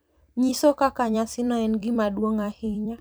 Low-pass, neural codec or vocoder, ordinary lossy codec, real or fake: none; vocoder, 44.1 kHz, 128 mel bands every 256 samples, BigVGAN v2; none; fake